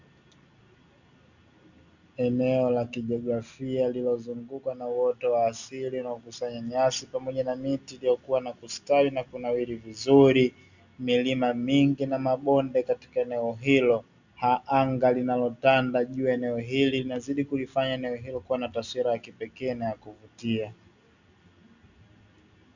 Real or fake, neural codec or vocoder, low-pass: real; none; 7.2 kHz